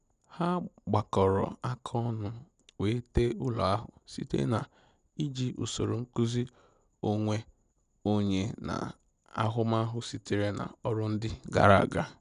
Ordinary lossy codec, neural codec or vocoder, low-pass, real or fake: none; none; 9.9 kHz; real